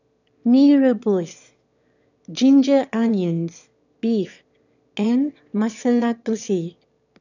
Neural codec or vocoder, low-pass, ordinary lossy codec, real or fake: autoencoder, 22.05 kHz, a latent of 192 numbers a frame, VITS, trained on one speaker; 7.2 kHz; none; fake